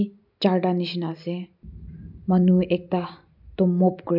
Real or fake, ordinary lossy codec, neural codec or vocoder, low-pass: real; none; none; 5.4 kHz